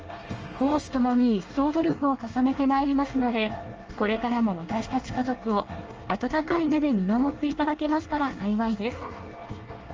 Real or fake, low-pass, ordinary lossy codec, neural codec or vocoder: fake; 7.2 kHz; Opus, 24 kbps; codec, 24 kHz, 1 kbps, SNAC